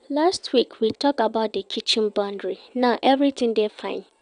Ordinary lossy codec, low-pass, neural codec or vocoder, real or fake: none; 9.9 kHz; vocoder, 22.05 kHz, 80 mel bands, WaveNeXt; fake